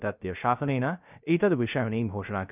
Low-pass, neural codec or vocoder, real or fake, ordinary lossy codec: 3.6 kHz; codec, 16 kHz, 0.3 kbps, FocalCodec; fake; none